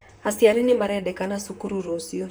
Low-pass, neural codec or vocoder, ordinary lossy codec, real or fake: none; vocoder, 44.1 kHz, 128 mel bands, Pupu-Vocoder; none; fake